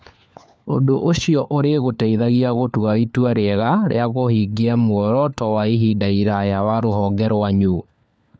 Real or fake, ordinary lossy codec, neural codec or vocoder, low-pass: fake; none; codec, 16 kHz, 4 kbps, FunCodec, trained on LibriTTS, 50 frames a second; none